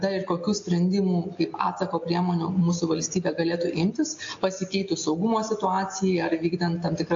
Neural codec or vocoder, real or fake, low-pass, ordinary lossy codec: none; real; 7.2 kHz; AAC, 48 kbps